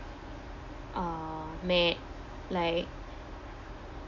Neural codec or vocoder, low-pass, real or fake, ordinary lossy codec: none; 7.2 kHz; real; MP3, 64 kbps